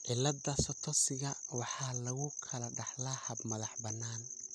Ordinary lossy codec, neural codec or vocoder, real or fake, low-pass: none; none; real; none